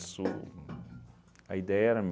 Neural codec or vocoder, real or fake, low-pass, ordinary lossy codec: none; real; none; none